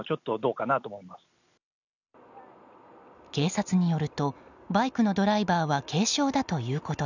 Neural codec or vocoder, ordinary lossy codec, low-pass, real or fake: none; none; 7.2 kHz; real